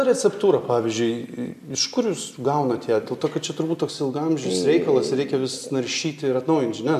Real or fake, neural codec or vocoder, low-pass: real; none; 14.4 kHz